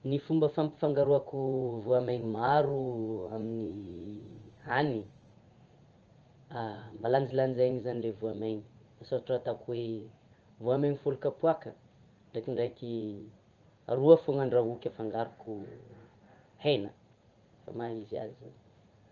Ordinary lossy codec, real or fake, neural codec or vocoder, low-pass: Opus, 24 kbps; fake; vocoder, 44.1 kHz, 80 mel bands, Vocos; 7.2 kHz